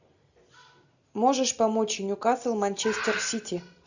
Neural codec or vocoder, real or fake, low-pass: none; real; 7.2 kHz